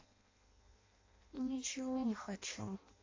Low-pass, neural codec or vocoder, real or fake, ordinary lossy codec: 7.2 kHz; codec, 16 kHz in and 24 kHz out, 0.6 kbps, FireRedTTS-2 codec; fake; AAC, 32 kbps